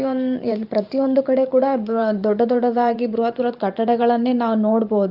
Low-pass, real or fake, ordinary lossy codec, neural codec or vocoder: 5.4 kHz; fake; Opus, 24 kbps; vocoder, 44.1 kHz, 128 mel bands every 512 samples, BigVGAN v2